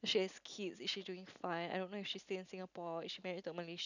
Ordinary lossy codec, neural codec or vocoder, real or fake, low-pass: none; none; real; 7.2 kHz